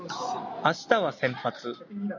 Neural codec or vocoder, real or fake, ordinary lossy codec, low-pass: none; real; MP3, 48 kbps; 7.2 kHz